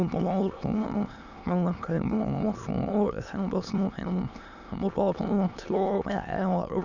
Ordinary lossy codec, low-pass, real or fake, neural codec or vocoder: none; 7.2 kHz; fake; autoencoder, 22.05 kHz, a latent of 192 numbers a frame, VITS, trained on many speakers